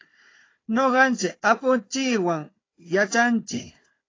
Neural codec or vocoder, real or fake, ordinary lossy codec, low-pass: codec, 16 kHz, 4 kbps, FunCodec, trained on Chinese and English, 50 frames a second; fake; AAC, 32 kbps; 7.2 kHz